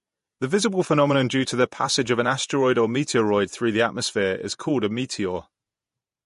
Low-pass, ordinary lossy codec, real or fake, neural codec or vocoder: 14.4 kHz; MP3, 48 kbps; fake; vocoder, 48 kHz, 128 mel bands, Vocos